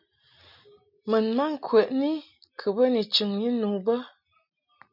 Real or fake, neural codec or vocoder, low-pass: real; none; 5.4 kHz